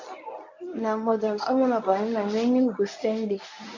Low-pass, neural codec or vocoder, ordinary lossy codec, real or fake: 7.2 kHz; codec, 24 kHz, 0.9 kbps, WavTokenizer, medium speech release version 1; AAC, 48 kbps; fake